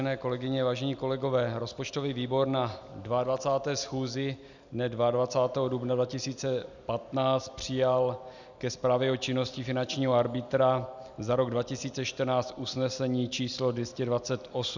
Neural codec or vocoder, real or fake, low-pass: none; real; 7.2 kHz